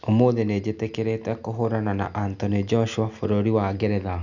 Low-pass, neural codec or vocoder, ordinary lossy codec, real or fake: 7.2 kHz; none; none; real